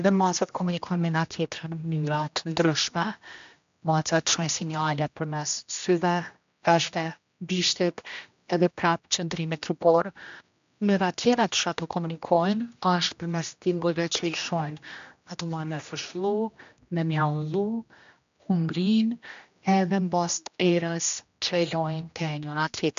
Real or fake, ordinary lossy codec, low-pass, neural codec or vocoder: fake; MP3, 64 kbps; 7.2 kHz; codec, 16 kHz, 1 kbps, X-Codec, HuBERT features, trained on general audio